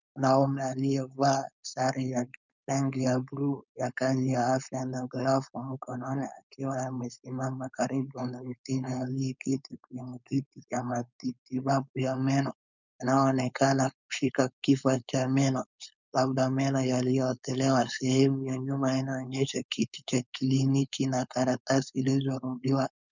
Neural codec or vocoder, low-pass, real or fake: codec, 16 kHz, 4.8 kbps, FACodec; 7.2 kHz; fake